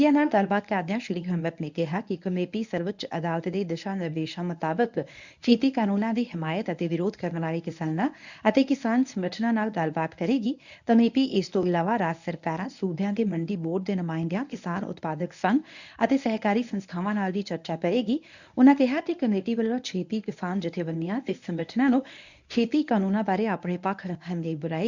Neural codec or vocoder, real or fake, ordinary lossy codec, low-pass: codec, 24 kHz, 0.9 kbps, WavTokenizer, medium speech release version 1; fake; none; 7.2 kHz